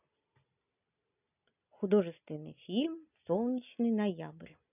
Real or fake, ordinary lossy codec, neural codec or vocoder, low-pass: real; none; none; 3.6 kHz